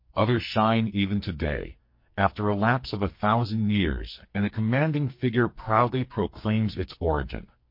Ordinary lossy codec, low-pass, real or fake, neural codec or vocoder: MP3, 32 kbps; 5.4 kHz; fake; codec, 44.1 kHz, 2.6 kbps, SNAC